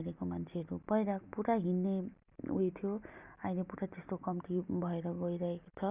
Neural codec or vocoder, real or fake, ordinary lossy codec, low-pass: none; real; Opus, 64 kbps; 3.6 kHz